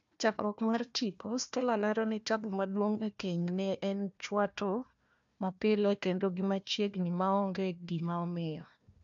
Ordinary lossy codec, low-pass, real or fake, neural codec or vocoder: MP3, 64 kbps; 7.2 kHz; fake; codec, 16 kHz, 1 kbps, FunCodec, trained on Chinese and English, 50 frames a second